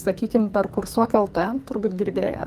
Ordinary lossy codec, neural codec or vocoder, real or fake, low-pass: Opus, 32 kbps; codec, 44.1 kHz, 2.6 kbps, SNAC; fake; 14.4 kHz